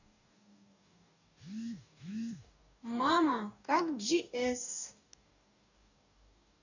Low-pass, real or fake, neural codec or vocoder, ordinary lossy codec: 7.2 kHz; fake; codec, 44.1 kHz, 2.6 kbps, DAC; none